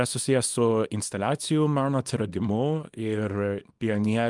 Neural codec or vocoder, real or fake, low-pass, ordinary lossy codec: codec, 24 kHz, 0.9 kbps, WavTokenizer, small release; fake; 10.8 kHz; Opus, 32 kbps